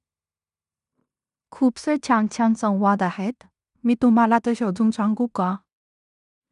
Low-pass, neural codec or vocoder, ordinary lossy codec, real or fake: 10.8 kHz; codec, 16 kHz in and 24 kHz out, 0.9 kbps, LongCat-Audio-Codec, fine tuned four codebook decoder; none; fake